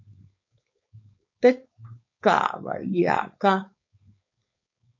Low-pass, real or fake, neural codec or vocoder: 7.2 kHz; fake; codec, 16 kHz, 4.8 kbps, FACodec